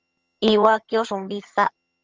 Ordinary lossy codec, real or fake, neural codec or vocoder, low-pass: Opus, 24 kbps; fake; vocoder, 22.05 kHz, 80 mel bands, HiFi-GAN; 7.2 kHz